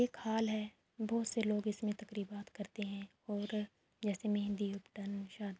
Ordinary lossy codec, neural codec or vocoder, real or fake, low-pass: none; none; real; none